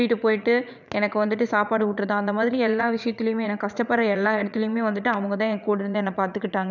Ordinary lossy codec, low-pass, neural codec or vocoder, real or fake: none; 7.2 kHz; vocoder, 44.1 kHz, 80 mel bands, Vocos; fake